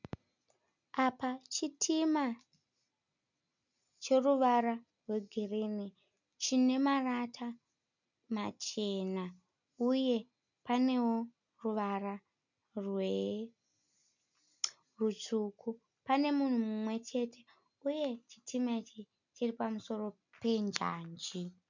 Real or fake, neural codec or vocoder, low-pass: real; none; 7.2 kHz